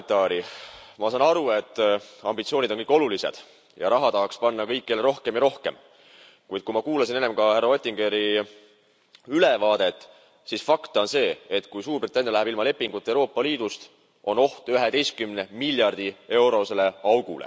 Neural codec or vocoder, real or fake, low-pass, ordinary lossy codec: none; real; none; none